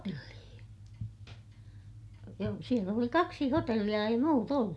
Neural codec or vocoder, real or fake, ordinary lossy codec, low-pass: none; real; none; none